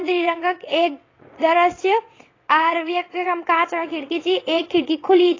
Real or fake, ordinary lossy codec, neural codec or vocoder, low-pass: fake; AAC, 32 kbps; vocoder, 22.05 kHz, 80 mel bands, WaveNeXt; 7.2 kHz